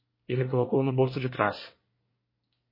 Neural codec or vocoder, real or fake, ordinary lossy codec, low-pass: codec, 24 kHz, 1 kbps, SNAC; fake; MP3, 24 kbps; 5.4 kHz